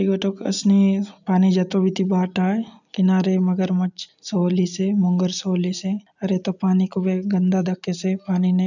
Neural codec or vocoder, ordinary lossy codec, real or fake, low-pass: none; none; real; 7.2 kHz